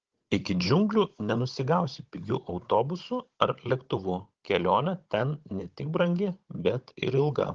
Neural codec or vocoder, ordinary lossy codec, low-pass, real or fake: codec, 16 kHz, 16 kbps, FunCodec, trained on Chinese and English, 50 frames a second; Opus, 16 kbps; 7.2 kHz; fake